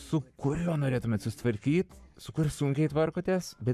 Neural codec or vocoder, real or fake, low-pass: codec, 44.1 kHz, 7.8 kbps, Pupu-Codec; fake; 14.4 kHz